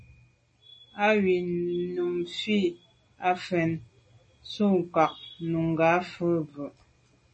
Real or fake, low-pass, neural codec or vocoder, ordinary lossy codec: real; 10.8 kHz; none; MP3, 32 kbps